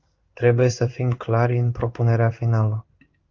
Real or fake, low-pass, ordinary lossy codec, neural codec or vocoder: fake; 7.2 kHz; Opus, 32 kbps; codec, 16 kHz in and 24 kHz out, 1 kbps, XY-Tokenizer